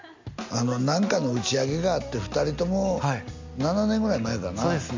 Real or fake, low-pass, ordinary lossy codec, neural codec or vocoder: real; 7.2 kHz; MP3, 64 kbps; none